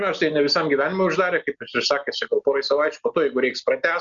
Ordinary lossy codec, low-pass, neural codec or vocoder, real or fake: Opus, 64 kbps; 7.2 kHz; none; real